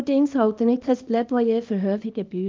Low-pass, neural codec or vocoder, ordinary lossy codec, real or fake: 7.2 kHz; codec, 24 kHz, 0.9 kbps, WavTokenizer, small release; Opus, 24 kbps; fake